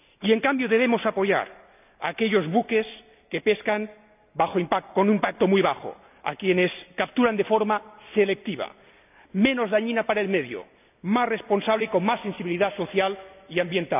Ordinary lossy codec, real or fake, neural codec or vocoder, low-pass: none; real; none; 3.6 kHz